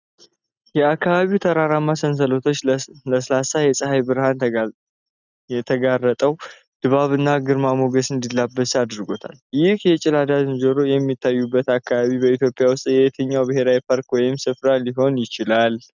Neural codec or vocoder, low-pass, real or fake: none; 7.2 kHz; real